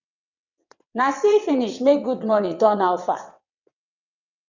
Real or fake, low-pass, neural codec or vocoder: fake; 7.2 kHz; vocoder, 22.05 kHz, 80 mel bands, WaveNeXt